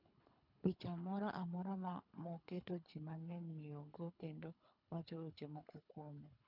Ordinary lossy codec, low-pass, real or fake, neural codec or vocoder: none; 5.4 kHz; fake; codec, 24 kHz, 3 kbps, HILCodec